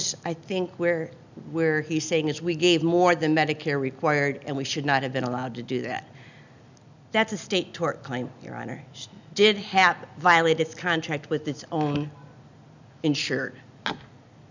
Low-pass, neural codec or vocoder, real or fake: 7.2 kHz; none; real